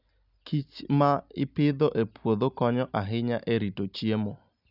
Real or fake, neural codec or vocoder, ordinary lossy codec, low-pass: real; none; none; 5.4 kHz